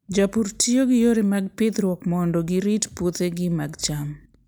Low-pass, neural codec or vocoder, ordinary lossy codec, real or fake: none; none; none; real